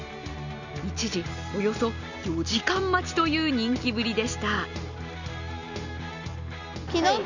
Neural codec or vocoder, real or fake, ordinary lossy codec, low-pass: none; real; none; 7.2 kHz